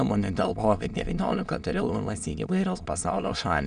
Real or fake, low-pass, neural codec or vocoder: fake; 9.9 kHz; autoencoder, 22.05 kHz, a latent of 192 numbers a frame, VITS, trained on many speakers